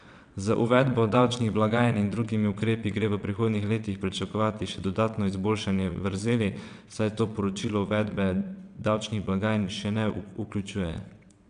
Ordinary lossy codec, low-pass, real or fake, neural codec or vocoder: none; 9.9 kHz; fake; vocoder, 22.05 kHz, 80 mel bands, WaveNeXt